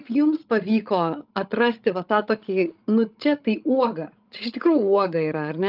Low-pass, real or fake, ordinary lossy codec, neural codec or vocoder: 5.4 kHz; fake; Opus, 24 kbps; codec, 16 kHz, 16 kbps, FreqCodec, larger model